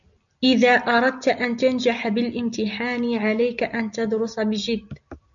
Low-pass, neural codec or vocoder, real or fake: 7.2 kHz; none; real